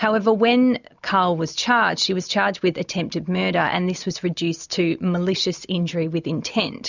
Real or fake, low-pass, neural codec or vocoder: real; 7.2 kHz; none